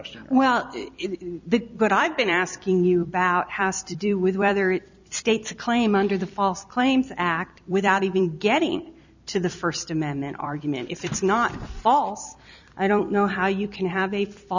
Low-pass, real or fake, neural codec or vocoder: 7.2 kHz; fake; vocoder, 44.1 kHz, 128 mel bands every 256 samples, BigVGAN v2